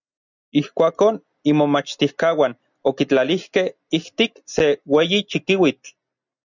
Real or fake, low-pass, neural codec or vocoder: real; 7.2 kHz; none